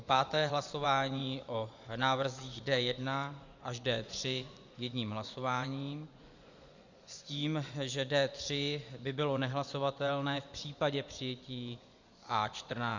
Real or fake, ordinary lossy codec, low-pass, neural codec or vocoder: fake; Opus, 64 kbps; 7.2 kHz; vocoder, 24 kHz, 100 mel bands, Vocos